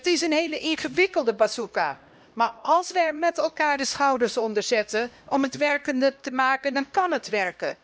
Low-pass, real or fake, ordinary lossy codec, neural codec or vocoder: none; fake; none; codec, 16 kHz, 1 kbps, X-Codec, HuBERT features, trained on LibriSpeech